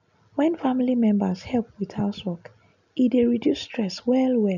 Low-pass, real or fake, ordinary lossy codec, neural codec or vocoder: 7.2 kHz; real; none; none